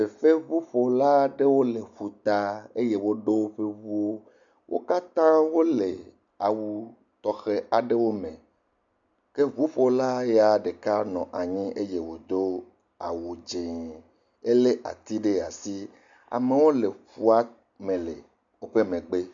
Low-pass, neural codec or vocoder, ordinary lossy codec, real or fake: 7.2 kHz; none; MP3, 64 kbps; real